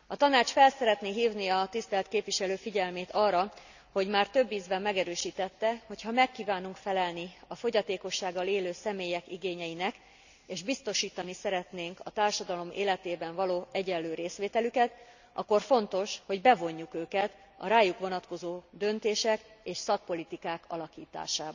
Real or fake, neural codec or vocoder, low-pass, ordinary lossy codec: real; none; 7.2 kHz; none